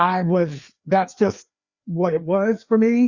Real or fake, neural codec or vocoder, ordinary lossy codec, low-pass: fake; codec, 16 kHz, 2 kbps, FreqCodec, larger model; Opus, 64 kbps; 7.2 kHz